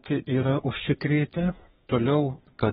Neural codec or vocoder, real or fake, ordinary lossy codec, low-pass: codec, 32 kHz, 1.9 kbps, SNAC; fake; AAC, 16 kbps; 14.4 kHz